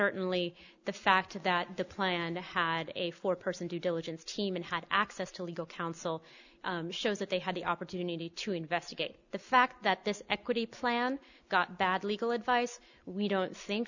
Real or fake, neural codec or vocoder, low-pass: real; none; 7.2 kHz